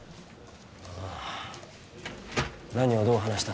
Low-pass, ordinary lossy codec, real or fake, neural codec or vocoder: none; none; real; none